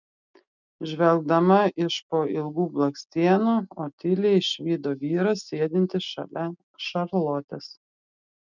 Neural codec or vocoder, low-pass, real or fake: none; 7.2 kHz; real